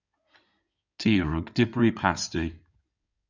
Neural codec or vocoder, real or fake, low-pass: codec, 16 kHz in and 24 kHz out, 2.2 kbps, FireRedTTS-2 codec; fake; 7.2 kHz